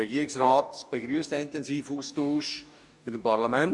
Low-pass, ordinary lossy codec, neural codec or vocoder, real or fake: 10.8 kHz; none; codec, 44.1 kHz, 2.6 kbps, DAC; fake